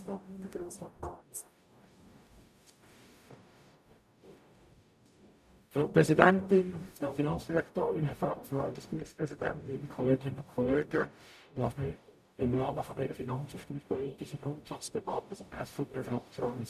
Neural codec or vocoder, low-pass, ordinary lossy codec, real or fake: codec, 44.1 kHz, 0.9 kbps, DAC; 14.4 kHz; none; fake